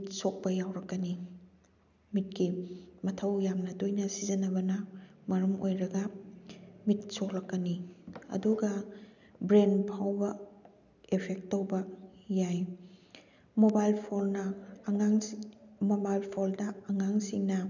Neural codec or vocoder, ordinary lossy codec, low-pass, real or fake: none; none; 7.2 kHz; real